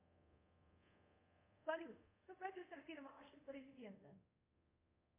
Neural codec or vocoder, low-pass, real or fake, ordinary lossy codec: codec, 24 kHz, 0.5 kbps, DualCodec; 3.6 kHz; fake; MP3, 24 kbps